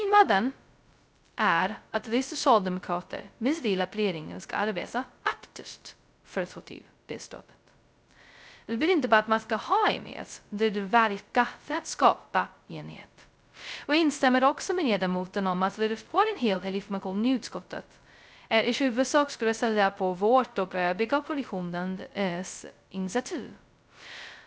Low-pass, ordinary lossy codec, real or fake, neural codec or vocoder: none; none; fake; codec, 16 kHz, 0.2 kbps, FocalCodec